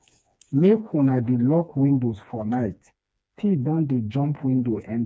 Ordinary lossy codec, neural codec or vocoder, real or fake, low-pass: none; codec, 16 kHz, 2 kbps, FreqCodec, smaller model; fake; none